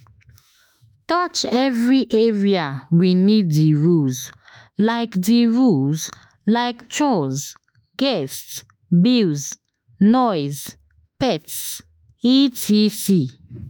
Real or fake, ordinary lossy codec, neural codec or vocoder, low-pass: fake; none; autoencoder, 48 kHz, 32 numbers a frame, DAC-VAE, trained on Japanese speech; none